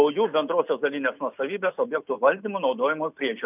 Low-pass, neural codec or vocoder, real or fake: 3.6 kHz; none; real